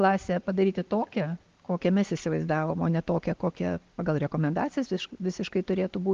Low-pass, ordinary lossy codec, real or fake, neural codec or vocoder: 7.2 kHz; Opus, 32 kbps; real; none